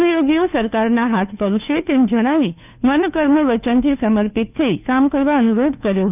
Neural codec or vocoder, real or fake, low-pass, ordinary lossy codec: codec, 16 kHz, 2 kbps, FunCodec, trained on Chinese and English, 25 frames a second; fake; 3.6 kHz; none